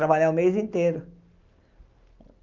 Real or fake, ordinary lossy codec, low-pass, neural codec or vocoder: real; Opus, 24 kbps; 7.2 kHz; none